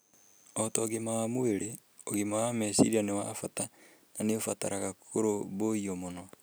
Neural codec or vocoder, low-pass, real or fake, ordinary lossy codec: none; none; real; none